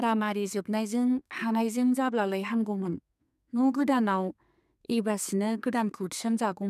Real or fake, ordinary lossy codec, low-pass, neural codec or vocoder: fake; none; 14.4 kHz; codec, 32 kHz, 1.9 kbps, SNAC